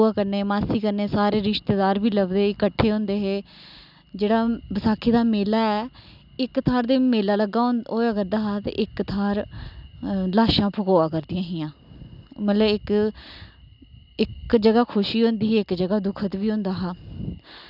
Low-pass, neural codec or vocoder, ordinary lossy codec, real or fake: 5.4 kHz; none; none; real